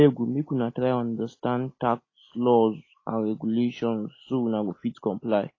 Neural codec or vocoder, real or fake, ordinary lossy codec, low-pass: none; real; AAC, 32 kbps; 7.2 kHz